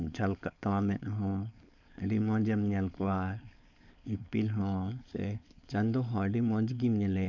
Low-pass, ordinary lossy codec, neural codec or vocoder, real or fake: 7.2 kHz; none; codec, 16 kHz, 4.8 kbps, FACodec; fake